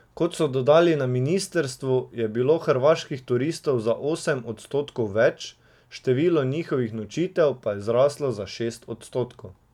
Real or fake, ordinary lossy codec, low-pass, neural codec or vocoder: real; none; 19.8 kHz; none